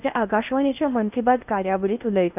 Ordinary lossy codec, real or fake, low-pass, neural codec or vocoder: none; fake; 3.6 kHz; codec, 16 kHz in and 24 kHz out, 0.6 kbps, FocalCodec, streaming, 4096 codes